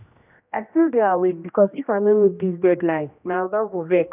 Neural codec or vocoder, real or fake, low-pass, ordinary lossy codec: codec, 16 kHz, 1 kbps, X-Codec, HuBERT features, trained on general audio; fake; 3.6 kHz; none